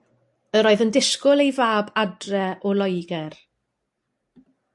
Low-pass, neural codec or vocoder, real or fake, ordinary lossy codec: 10.8 kHz; none; real; AAC, 64 kbps